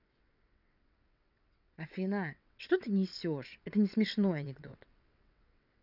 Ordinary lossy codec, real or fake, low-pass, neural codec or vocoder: none; fake; 5.4 kHz; vocoder, 22.05 kHz, 80 mel bands, WaveNeXt